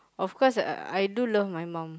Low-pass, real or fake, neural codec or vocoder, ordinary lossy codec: none; real; none; none